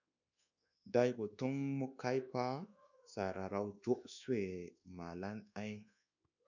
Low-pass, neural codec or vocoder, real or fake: 7.2 kHz; codec, 24 kHz, 1.2 kbps, DualCodec; fake